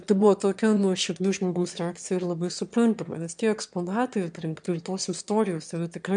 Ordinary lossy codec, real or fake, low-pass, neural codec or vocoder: MP3, 96 kbps; fake; 9.9 kHz; autoencoder, 22.05 kHz, a latent of 192 numbers a frame, VITS, trained on one speaker